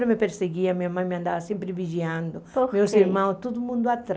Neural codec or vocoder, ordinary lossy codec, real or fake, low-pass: none; none; real; none